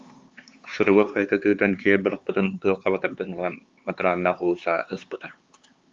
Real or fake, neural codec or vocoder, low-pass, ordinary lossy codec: fake; codec, 16 kHz, 4 kbps, X-Codec, HuBERT features, trained on LibriSpeech; 7.2 kHz; Opus, 32 kbps